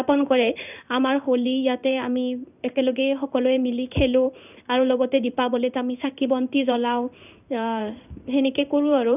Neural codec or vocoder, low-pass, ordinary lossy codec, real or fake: codec, 16 kHz in and 24 kHz out, 1 kbps, XY-Tokenizer; 3.6 kHz; none; fake